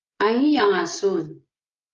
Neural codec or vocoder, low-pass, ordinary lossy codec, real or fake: codec, 16 kHz, 16 kbps, FreqCodec, smaller model; 7.2 kHz; Opus, 32 kbps; fake